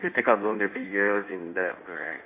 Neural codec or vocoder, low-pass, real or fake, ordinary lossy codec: codec, 16 kHz in and 24 kHz out, 1.1 kbps, FireRedTTS-2 codec; 3.6 kHz; fake; none